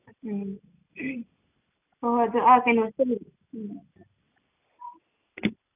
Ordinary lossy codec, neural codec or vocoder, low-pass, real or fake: none; none; 3.6 kHz; real